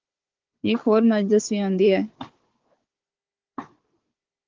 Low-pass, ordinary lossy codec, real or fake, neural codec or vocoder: 7.2 kHz; Opus, 16 kbps; fake; codec, 16 kHz, 4 kbps, FunCodec, trained on Chinese and English, 50 frames a second